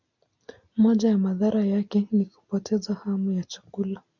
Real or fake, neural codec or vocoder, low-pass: real; none; 7.2 kHz